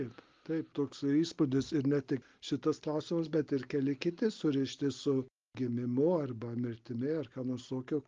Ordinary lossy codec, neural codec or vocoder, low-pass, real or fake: Opus, 32 kbps; none; 7.2 kHz; real